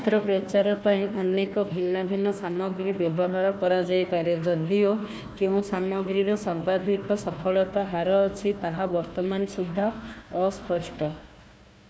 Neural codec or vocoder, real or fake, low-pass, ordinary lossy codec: codec, 16 kHz, 1 kbps, FunCodec, trained on Chinese and English, 50 frames a second; fake; none; none